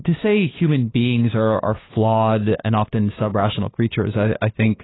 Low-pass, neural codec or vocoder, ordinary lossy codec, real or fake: 7.2 kHz; codec, 16 kHz, 2 kbps, FunCodec, trained on LibriTTS, 25 frames a second; AAC, 16 kbps; fake